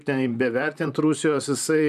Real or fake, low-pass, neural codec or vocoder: fake; 14.4 kHz; vocoder, 44.1 kHz, 128 mel bands, Pupu-Vocoder